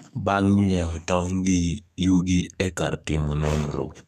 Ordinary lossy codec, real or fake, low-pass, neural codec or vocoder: none; fake; 14.4 kHz; codec, 32 kHz, 1.9 kbps, SNAC